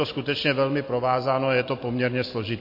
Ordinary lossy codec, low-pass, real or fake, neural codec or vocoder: MP3, 32 kbps; 5.4 kHz; real; none